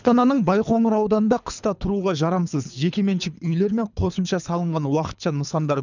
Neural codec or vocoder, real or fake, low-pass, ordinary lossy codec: codec, 24 kHz, 3 kbps, HILCodec; fake; 7.2 kHz; none